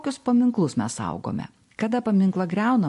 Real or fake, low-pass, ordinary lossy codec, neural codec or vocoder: real; 14.4 kHz; MP3, 48 kbps; none